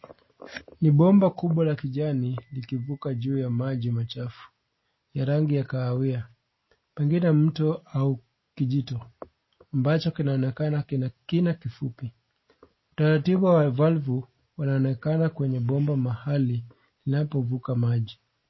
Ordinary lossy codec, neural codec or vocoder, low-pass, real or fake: MP3, 24 kbps; none; 7.2 kHz; real